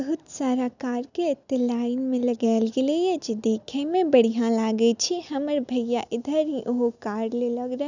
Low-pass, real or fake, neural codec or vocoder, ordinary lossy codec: 7.2 kHz; real; none; none